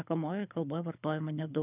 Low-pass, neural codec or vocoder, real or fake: 3.6 kHz; codec, 24 kHz, 6 kbps, HILCodec; fake